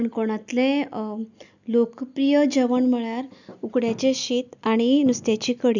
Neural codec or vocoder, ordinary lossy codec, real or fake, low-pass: none; none; real; 7.2 kHz